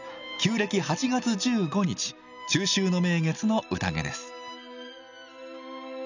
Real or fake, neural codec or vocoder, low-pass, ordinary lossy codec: real; none; 7.2 kHz; none